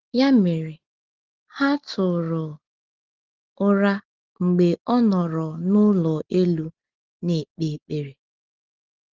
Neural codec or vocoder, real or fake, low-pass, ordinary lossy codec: none; real; 7.2 kHz; Opus, 16 kbps